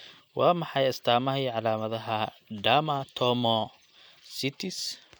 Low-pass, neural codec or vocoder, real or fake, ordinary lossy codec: none; none; real; none